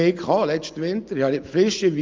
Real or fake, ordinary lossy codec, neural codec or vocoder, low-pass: real; Opus, 24 kbps; none; 7.2 kHz